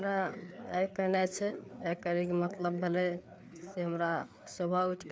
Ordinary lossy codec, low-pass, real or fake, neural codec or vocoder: none; none; fake; codec, 16 kHz, 4 kbps, FreqCodec, larger model